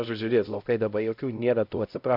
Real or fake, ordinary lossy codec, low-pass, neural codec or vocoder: fake; MP3, 48 kbps; 5.4 kHz; codec, 16 kHz, 0.5 kbps, X-Codec, HuBERT features, trained on LibriSpeech